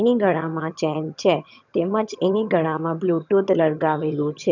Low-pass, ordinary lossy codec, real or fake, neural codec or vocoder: 7.2 kHz; none; fake; vocoder, 22.05 kHz, 80 mel bands, HiFi-GAN